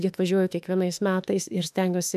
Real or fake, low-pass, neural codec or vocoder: fake; 14.4 kHz; autoencoder, 48 kHz, 32 numbers a frame, DAC-VAE, trained on Japanese speech